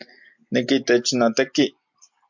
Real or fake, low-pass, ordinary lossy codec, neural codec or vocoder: real; 7.2 kHz; MP3, 64 kbps; none